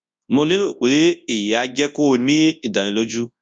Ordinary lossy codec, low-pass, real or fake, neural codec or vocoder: none; 9.9 kHz; fake; codec, 24 kHz, 0.9 kbps, WavTokenizer, large speech release